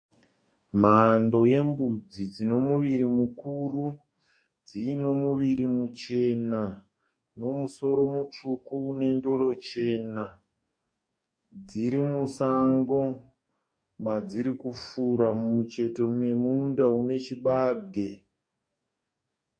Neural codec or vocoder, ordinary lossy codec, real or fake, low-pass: codec, 44.1 kHz, 2.6 kbps, DAC; MP3, 48 kbps; fake; 9.9 kHz